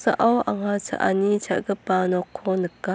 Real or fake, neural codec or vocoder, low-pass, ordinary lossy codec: real; none; none; none